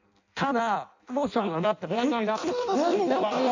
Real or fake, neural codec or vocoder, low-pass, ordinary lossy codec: fake; codec, 16 kHz in and 24 kHz out, 0.6 kbps, FireRedTTS-2 codec; 7.2 kHz; AAC, 48 kbps